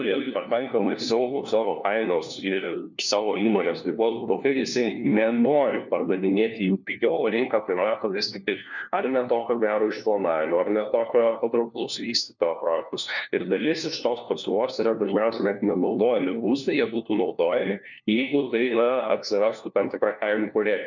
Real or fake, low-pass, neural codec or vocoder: fake; 7.2 kHz; codec, 16 kHz, 1 kbps, FunCodec, trained on LibriTTS, 50 frames a second